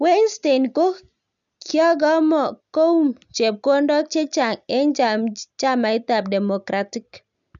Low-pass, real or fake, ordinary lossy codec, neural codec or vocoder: 7.2 kHz; real; none; none